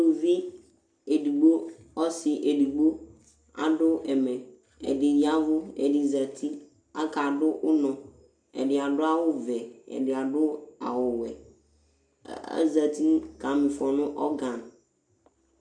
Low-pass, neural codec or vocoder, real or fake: 9.9 kHz; none; real